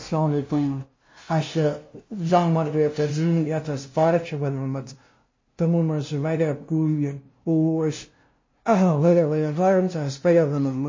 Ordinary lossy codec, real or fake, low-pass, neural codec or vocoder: MP3, 32 kbps; fake; 7.2 kHz; codec, 16 kHz, 0.5 kbps, FunCodec, trained on LibriTTS, 25 frames a second